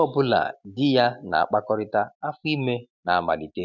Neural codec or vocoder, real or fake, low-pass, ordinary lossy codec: none; real; 7.2 kHz; none